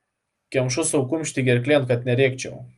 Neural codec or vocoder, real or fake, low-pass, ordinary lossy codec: none; real; 10.8 kHz; Opus, 32 kbps